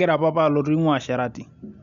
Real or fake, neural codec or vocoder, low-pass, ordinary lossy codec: real; none; 7.2 kHz; none